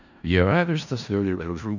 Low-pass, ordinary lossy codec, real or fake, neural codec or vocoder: 7.2 kHz; none; fake; codec, 16 kHz in and 24 kHz out, 0.4 kbps, LongCat-Audio-Codec, four codebook decoder